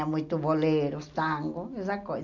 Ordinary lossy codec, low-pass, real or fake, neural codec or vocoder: none; 7.2 kHz; real; none